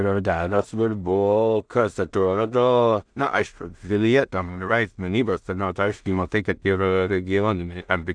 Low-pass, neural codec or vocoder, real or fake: 9.9 kHz; codec, 16 kHz in and 24 kHz out, 0.4 kbps, LongCat-Audio-Codec, two codebook decoder; fake